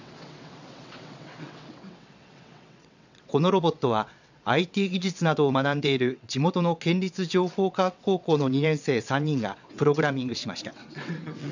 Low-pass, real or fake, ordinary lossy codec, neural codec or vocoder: 7.2 kHz; fake; none; vocoder, 22.05 kHz, 80 mel bands, WaveNeXt